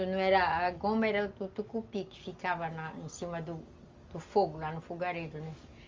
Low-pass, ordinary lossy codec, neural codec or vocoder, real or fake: 7.2 kHz; Opus, 32 kbps; none; real